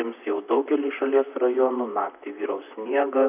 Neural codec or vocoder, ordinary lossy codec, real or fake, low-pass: vocoder, 44.1 kHz, 128 mel bands, Pupu-Vocoder; AAC, 32 kbps; fake; 3.6 kHz